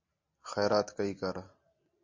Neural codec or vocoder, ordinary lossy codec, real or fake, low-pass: none; MP3, 48 kbps; real; 7.2 kHz